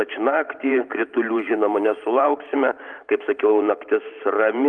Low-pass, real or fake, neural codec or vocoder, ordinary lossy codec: 9.9 kHz; fake; vocoder, 44.1 kHz, 128 mel bands every 512 samples, BigVGAN v2; Opus, 32 kbps